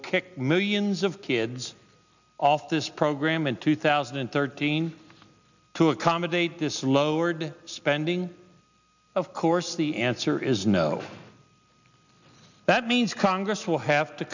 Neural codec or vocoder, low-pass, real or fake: none; 7.2 kHz; real